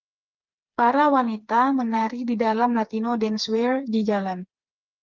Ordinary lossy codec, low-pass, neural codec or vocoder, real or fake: Opus, 32 kbps; 7.2 kHz; codec, 16 kHz, 4 kbps, FreqCodec, smaller model; fake